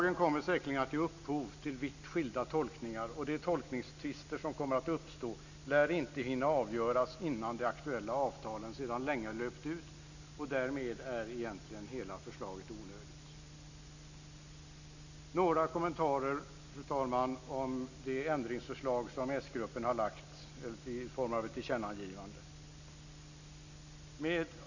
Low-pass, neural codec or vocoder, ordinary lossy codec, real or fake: 7.2 kHz; none; none; real